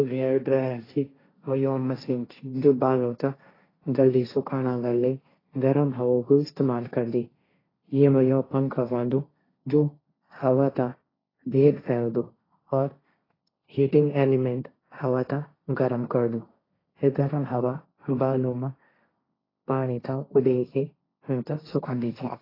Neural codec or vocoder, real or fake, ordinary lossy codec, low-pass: codec, 16 kHz, 1.1 kbps, Voila-Tokenizer; fake; AAC, 24 kbps; 5.4 kHz